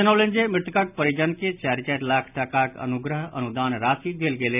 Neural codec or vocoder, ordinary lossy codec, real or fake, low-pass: none; none; real; 3.6 kHz